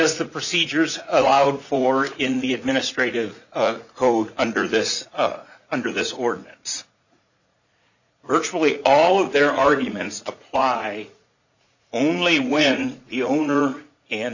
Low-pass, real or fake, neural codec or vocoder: 7.2 kHz; fake; vocoder, 44.1 kHz, 80 mel bands, Vocos